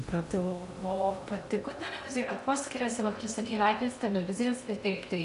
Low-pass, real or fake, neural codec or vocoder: 10.8 kHz; fake; codec, 16 kHz in and 24 kHz out, 0.6 kbps, FocalCodec, streaming, 2048 codes